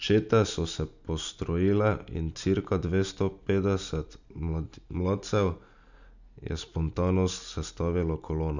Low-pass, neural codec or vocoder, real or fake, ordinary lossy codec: 7.2 kHz; none; real; none